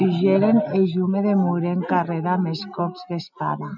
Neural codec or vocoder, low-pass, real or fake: none; 7.2 kHz; real